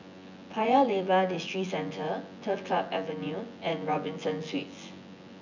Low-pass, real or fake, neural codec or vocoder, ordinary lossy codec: 7.2 kHz; fake; vocoder, 24 kHz, 100 mel bands, Vocos; none